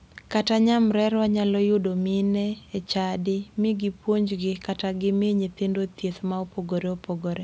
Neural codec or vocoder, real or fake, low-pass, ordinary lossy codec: none; real; none; none